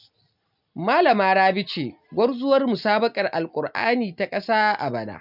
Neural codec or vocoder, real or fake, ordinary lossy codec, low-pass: none; real; none; 5.4 kHz